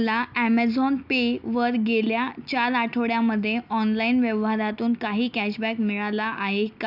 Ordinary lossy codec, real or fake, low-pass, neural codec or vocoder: none; real; 5.4 kHz; none